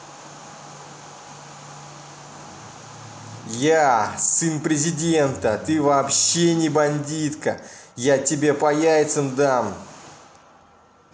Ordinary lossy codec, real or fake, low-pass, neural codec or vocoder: none; real; none; none